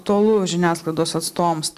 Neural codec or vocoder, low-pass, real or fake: none; 14.4 kHz; real